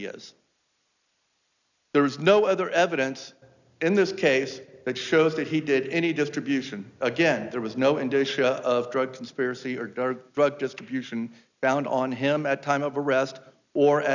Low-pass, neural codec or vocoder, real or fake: 7.2 kHz; none; real